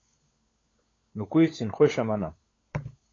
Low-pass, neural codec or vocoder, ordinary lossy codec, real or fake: 7.2 kHz; codec, 16 kHz, 16 kbps, FunCodec, trained on LibriTTS, 50 frames a second; AAC, 32 kbps; fake